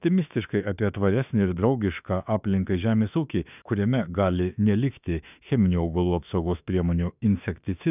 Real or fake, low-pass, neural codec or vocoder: fake; 3.6 kHz; autoencoder, 48 kHz, 32 numbers a frame, DAC-VAE, trained on Japanese speech